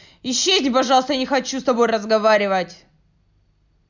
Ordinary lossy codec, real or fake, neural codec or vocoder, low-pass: none; real; none; 7.2 kHz